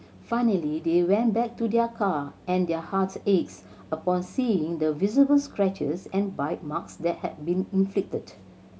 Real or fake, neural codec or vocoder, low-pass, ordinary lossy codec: real; none; none; none